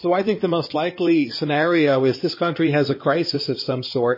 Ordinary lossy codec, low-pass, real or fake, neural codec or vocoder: MP3, 24 kbps; 5.4 kHz; fake; codec, 16 kHz in and 24 kHz out, 2.2 kbps, FireRedTTS-2 codec